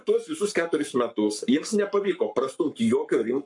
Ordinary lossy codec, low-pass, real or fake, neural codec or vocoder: MP3, 64 kbps; 10.8 kHz; fake; codec, 44.1 kHz, 7.8 kbps, Pupu-Codec